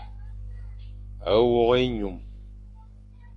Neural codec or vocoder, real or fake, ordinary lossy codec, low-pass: codec, 44.1 kHz, 7.8 kbps, Pupu-Codec; fake; AAC, 48 kbps; 10.8 kHz